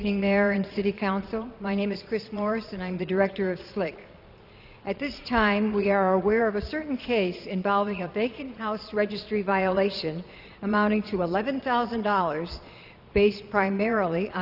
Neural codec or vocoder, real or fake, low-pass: vocoder, 22.05 kHz, 80 mel bands, Vocos; fake; 5.4 kHz